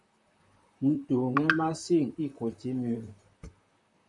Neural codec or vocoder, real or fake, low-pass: vocoder, 44.1 kHz, 128 mel bands, Pupu-Vocoder; fake; 10.8 kHz